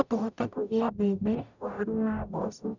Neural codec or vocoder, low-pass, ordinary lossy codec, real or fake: codec, 44.1 kHz, 0.9 kbps, DAC; 7.2 kHz; none; fake